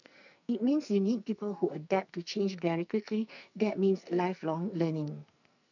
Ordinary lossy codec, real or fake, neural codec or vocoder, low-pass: none; fake; codec, 44.1 kHz, 2.6 kbps, SNAC; 7.2 kHz